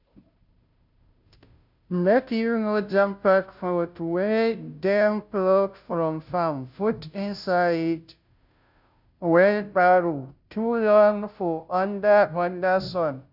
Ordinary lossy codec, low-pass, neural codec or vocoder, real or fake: none; 5.4 kHz; codec, 16 kHz, 0.5 kbps, FunCodec, trained on Chinese and English, 25 frames a second; fake